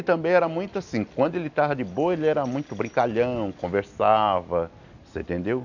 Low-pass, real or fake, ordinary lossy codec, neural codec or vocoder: 7.2 kHz; real; none; none